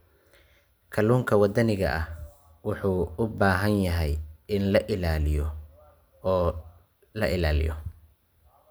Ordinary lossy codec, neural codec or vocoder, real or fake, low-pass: none; none; real; none